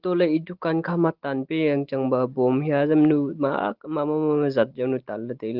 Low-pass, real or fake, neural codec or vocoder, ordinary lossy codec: 5.4 kHz; real; none; Opus, 16 kbps